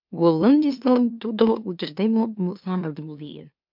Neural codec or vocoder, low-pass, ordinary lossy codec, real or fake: autoencoder, 44.1 kHz, a latent of 192 numbers a frame, MeloTTS; 5.4 kHz; MP3, 48 kbps; fake